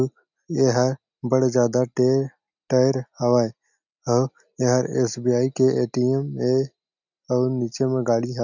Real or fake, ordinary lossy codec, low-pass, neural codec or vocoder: real; none; 7.2 kHz; none